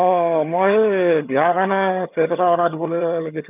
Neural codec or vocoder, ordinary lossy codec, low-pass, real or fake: vocoder, 22.05 kHz, 80 mel bands, HiFi-GAN; none; 3.6 kHz; fake